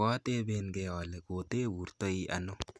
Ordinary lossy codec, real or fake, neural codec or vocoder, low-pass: none; real; none; none